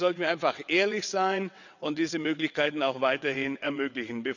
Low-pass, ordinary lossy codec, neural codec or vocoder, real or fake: 7.2 kHz; none; vocoder, 22.05 kHz, 80 mel bands, WaveNeXt; fake